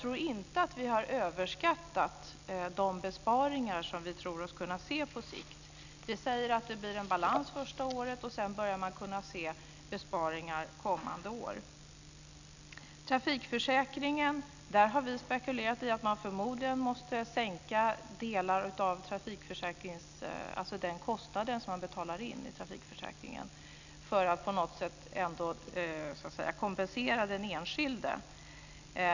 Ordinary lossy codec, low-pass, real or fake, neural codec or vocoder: none; 7.2 kHz; real; none